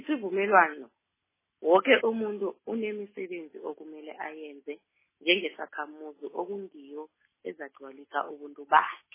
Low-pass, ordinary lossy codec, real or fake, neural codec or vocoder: 3.6 kHz; MP3, 16 kbps; real; none